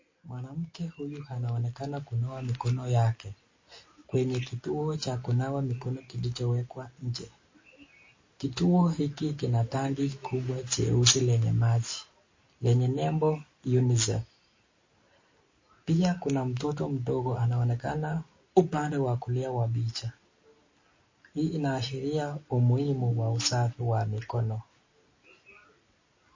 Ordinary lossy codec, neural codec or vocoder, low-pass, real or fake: MP3, 32 kbps; none; 7.2 kHz; real